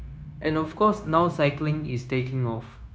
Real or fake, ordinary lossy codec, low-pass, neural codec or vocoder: fake; none; none; codec, 16 kHz, 0.9 kbps, LongCat-Audio-Codec